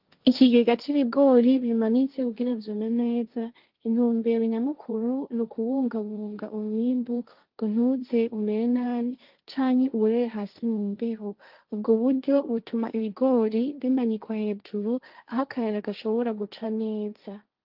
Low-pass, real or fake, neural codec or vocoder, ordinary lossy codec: 5.4 kHz; fake; codec, 16 kHz, 1.1 kbps, Voila-Tokenizer; Opus, 24 kbps